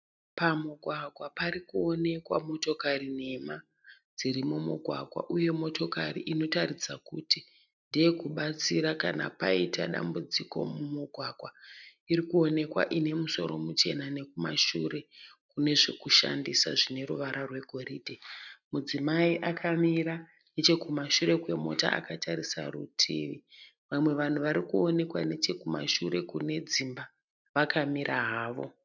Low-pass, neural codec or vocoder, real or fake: 7.2 kHz; none; real